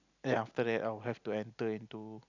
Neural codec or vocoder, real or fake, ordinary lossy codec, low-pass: none; real; none; 7.2 kHz